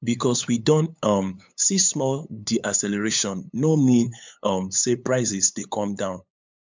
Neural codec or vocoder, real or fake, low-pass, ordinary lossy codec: codec, 16 kHz, 8 kbps, FunCodec, trained on LibriTTS, 25 frames a second; fake; 7.2 kHz; MP3, 64 kbps